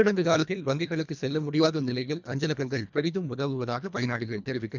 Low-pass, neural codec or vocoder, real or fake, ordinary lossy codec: 7.2 kHz; codec, 24 kHz, 1.5 kbps, HILCodec; fake; none